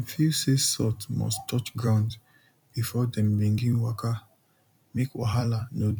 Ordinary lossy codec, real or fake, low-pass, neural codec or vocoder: none; fake; 19.8 kHz; vocoder, 44.1 kHz, 128 mel bands every 256 samples, BigVGAN v2